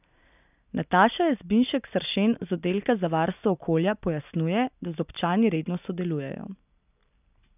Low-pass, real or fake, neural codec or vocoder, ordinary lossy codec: 3.6 kHz; real; none; none